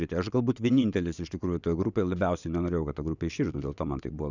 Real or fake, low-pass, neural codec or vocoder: fake; 7.2 kHz; vocoder, 22.05 kHz, 80 mel bands, WaveNeXt